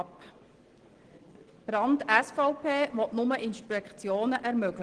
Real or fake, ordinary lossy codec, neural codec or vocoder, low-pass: real; Opus, 16 kbps; none; 9.9 kHz